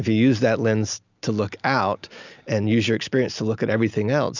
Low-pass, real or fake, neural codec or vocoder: 7.2 kHz; real; none